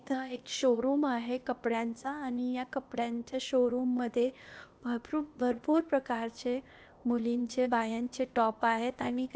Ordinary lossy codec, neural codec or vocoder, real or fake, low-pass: none; codec, 16 kHz, 0.8 kbps, ZipCodec; fake; none